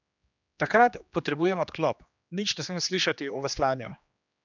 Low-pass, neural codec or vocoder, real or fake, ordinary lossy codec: 7.2 kHz; codec, 16 kHz, 2 kbps, X-Codec, HuBERT features, trained on general audio; fake; none